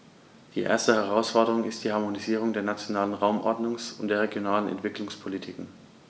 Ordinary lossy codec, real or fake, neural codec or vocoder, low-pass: none; real; none; none